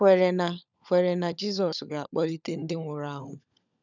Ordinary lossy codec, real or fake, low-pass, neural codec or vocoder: none; fake; 7.2 kHz; codec, 16 kHz, 16 kbps, FunCodec, trained on LibriTTS, 50 frames a second